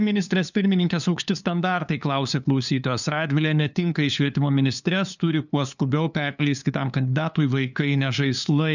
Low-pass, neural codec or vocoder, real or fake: 7.2 kHz; codec, 16 kHz, 2 kbps, FunCodec, trained on LibriTTS, 25 frames a second; fake